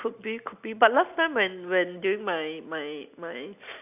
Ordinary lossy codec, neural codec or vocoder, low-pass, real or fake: none; none; 3.6 kHz; real